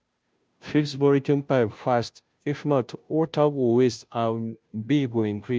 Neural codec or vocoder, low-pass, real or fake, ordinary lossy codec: codec, 16 kHz, 0.5 kbps, FunCodec, trained on Chinese and English, 25 frames a second; none; fake; none